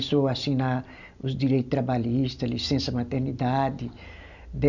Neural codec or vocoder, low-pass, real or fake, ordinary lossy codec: none; 7.2 kHz; real; none